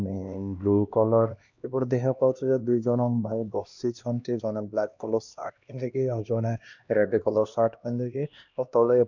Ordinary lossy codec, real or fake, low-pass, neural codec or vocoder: none; fake; 7.2 kHz; codec, 16 kHz, 1 kbps, X-Codec, HuBERT features, trained on LibriSpeech